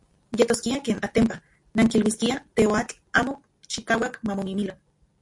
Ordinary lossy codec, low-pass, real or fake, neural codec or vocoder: MP3, 48 kbps; 10.8 kHz; real; none